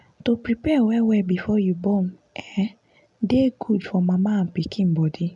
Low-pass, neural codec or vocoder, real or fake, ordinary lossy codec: 10.8 kHz; none; real; none